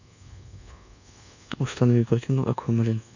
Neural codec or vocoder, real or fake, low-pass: codec, 24 kHz, 1.2 kbps, DualCodec; fake; 7.2 kHz